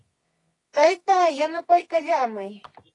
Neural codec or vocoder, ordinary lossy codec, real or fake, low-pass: codec, 24 kHz, 0.9 kbps, WavTokenizer, medium music audio release; AAC, 32 kbps; fake; 10.8 kHz